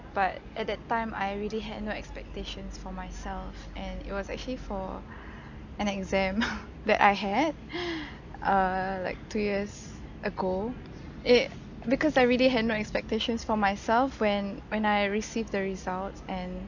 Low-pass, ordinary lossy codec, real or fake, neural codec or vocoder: 7.2 kHz; none; real; none